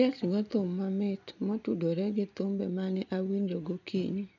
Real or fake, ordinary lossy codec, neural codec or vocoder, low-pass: real; none; none; 7.2 kHz